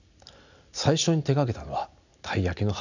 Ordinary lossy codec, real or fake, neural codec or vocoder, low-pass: none; real; none; 7.2 kHz